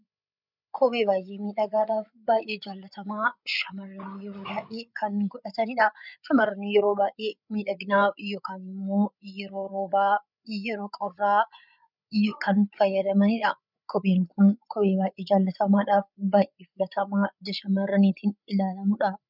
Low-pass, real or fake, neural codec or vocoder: 5.4 kHz; fake; codec, 16 kHz, 8 kbps, FreqCodec, larger model